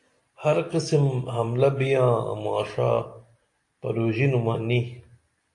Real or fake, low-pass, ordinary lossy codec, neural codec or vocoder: real; 10.8 kHz; AAC, 48 kbps; none